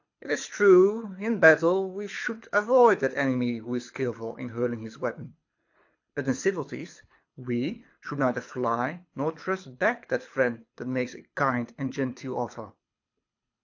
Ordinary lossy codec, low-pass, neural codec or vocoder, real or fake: AAC, 48 kbps; 7.2 kHz; codec, 24 kHz, 6 kbps, HILCodec; fake